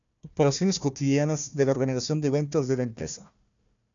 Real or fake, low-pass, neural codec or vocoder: fake; 7.2 kHz; codec, 16 kHz, 1 kbps, FunCodec, trained on Chinese and English, 50 frames a second